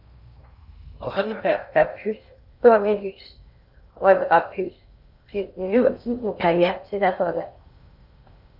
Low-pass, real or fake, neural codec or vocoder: 5.4 kHz; fake; codec, 16 kHz in and 24 kHz out, 0.6 kbps, FocalCodec, streaming, 2048 codes